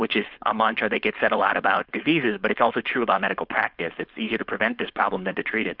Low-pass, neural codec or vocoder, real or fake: 5.4 kHz; codec, 16 kHz, 4.8 kbps, FACodec; fake